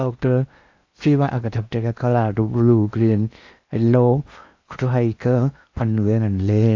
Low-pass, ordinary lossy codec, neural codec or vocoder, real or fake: 7.2 kHz; AAC, 48 kbps; codec, 16 kHz in and 24 kHz out, 0.8 kbps, FocalCodec, streaming, 65536 codes; fake